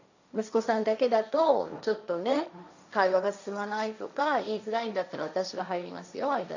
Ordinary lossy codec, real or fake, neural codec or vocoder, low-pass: none; fake; codec, 16 kHz, 1.1 kbps, Voila-Tokenizer; 7.2 kHz